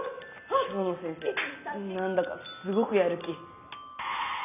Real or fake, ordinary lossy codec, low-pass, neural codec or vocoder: real; none; 3.6 kHz; none